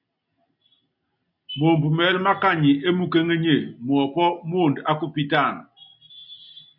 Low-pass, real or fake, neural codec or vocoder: 5.4 kHz; real; none